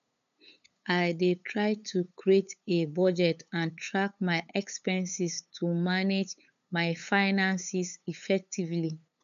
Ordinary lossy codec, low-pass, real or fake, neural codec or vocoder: none; 7.2 kHz; fake; codec, 16 kHz, 8 kbps, FunCodec, trained on LibriTTS, 25 frames a second